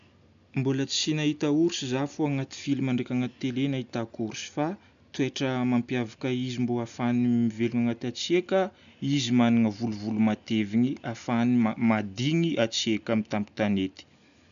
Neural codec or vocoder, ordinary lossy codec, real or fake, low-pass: none; none; real; 7.2 kHz